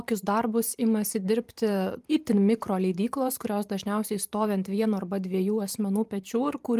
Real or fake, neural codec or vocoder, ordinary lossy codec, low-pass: fake; vocoder, 44.1 kHz, 128 mel bands every 512 samples, BigVGAN v2; Opus, 32 kbps; 14.4 kHz